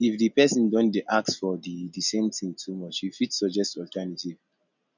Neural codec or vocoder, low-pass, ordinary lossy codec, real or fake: none; 7.2 kHz; none; real